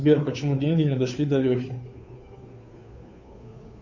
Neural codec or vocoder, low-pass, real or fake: codec, 16 kHz, 2 kbps, FunCodec, trained on Chinese and English, 25 frames a second; 7.2 kHz; fake